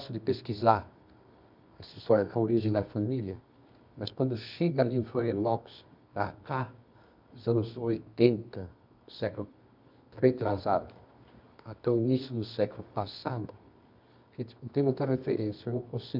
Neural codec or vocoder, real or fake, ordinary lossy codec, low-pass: codec, 24 kHz, 0.9 kbps, WavTokenizer, medium music audio release; fake; none; 5.4 kHz